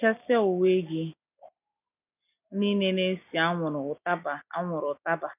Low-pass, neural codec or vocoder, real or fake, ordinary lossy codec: 3.6 kHz; none; real; none